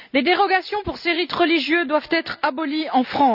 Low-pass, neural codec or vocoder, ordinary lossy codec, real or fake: 5.4 kHz; none; none; real